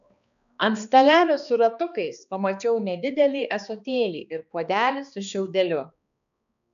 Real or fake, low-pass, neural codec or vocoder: fake; 7.2 kHz; codec, 16 kHz, 2 kbps, X-Codec, HuBERT features, trained on balanced general audio